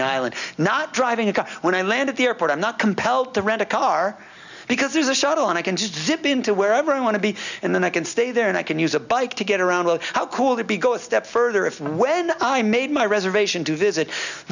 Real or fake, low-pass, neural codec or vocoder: real; 7.2 kHz; none